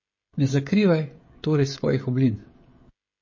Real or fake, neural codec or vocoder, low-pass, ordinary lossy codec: fake; codec, 16 kHz, 16 kbps, FreqCodec, smaller model; 7.2 kHz; MP3, 32 kbps